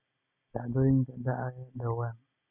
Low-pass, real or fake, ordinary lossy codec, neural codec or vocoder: 3.6 kHz; real; none; none